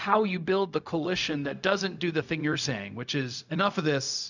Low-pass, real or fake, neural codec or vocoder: 7.2 kHz; fake; codec, 16 kHz, 0.4 kbps, LongCat-Audio-Codec